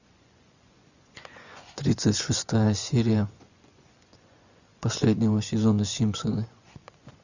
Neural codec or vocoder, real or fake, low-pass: vocoder, 24 kHz, 100 mel bands, Vocos; fake; 7.2 kHz